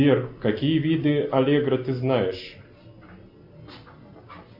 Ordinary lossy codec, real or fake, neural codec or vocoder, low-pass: MP3, 48 kbps; real; none; 5.4 kHz